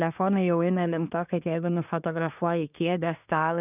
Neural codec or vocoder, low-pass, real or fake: codec, 24 kHz, 1 kbps, SNAC; 3.6 kHz; fake